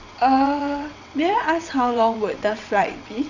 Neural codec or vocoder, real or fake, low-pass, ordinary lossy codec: vocoder, 22.05 kHz, 80 mel bands, WaveNeXt; fake; 7.2 kHz; none